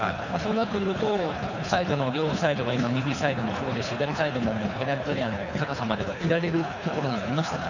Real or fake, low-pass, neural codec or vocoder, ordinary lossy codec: fake; 7.2 kHz; codec, 24 kHz, 3 kbps, HILCodec; none